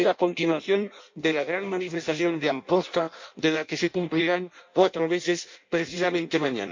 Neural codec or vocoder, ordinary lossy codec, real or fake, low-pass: codec, 16 kHz in and 24 kHz out, 0.6 kbps, FireRedTTS-2 codec; MP3, 48 kbps; fake; 7.2 kHz